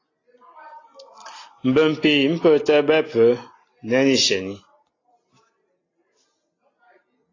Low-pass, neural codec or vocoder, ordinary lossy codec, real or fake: 7.2 kHz; none; AAC, 32 kbps; real